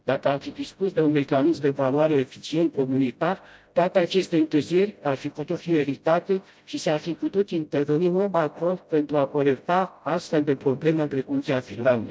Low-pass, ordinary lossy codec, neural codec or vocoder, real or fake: none; none; codec, 16 kHz, 0.5 kbps, FreqCodec, smaller model; fake